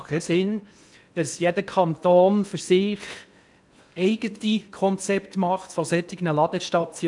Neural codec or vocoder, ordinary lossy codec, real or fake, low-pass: codec, 16 kHz in and 24 kHz out, 0.8 kbps, FocalCodec, streaming, 65536 codes; none; fake; 10.8 kHz